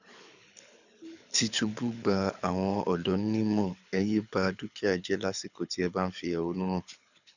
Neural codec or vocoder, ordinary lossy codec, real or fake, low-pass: codec, 24 kHz, 6 kbps, HILCodec; none; fake; 7.2 kHz